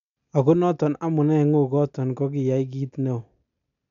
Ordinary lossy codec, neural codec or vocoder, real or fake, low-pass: MP3, 64 kbps; none; real; 7.2 kHz